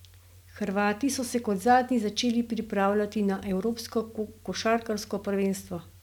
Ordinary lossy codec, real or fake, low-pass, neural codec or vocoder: none; real; 19.8 kHz; none